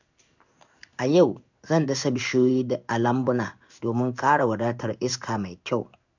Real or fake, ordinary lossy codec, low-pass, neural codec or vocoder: fake; none; 7.2 kHz; codec, 16 kHz in and 24 kHz out, 1 kbps, XY-Tokenizer